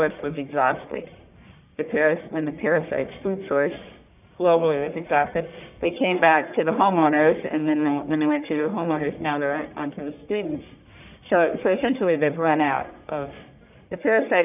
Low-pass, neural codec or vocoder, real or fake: 3.6 kHz; codec, 44.1 kHz, 1.7 kbps, Pupu-Codec; fake